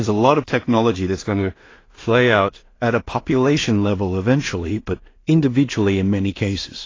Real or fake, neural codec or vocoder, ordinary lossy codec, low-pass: fake; codec, 16 kHz in and 24 kHz out, 0.4 kbps, LongCat-Audio-Codec, two codebook decoder; AAC, 32 kbps; 7.2 kHz